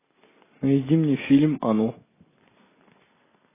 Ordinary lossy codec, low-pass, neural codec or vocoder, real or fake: AAC, 16 kbps; 3.6 kHz; none; real